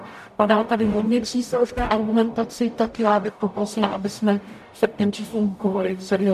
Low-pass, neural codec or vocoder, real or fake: 14.4 kHz; codec, 44.1 kHz, 0.9 kbps, DAC; fake